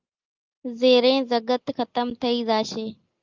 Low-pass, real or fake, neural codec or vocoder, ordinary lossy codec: 7.2 kHz; real; none; Opus, 24 kbps